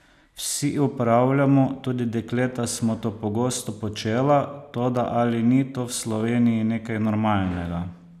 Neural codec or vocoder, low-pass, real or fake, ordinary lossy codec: none; 14.4 kHz; real; none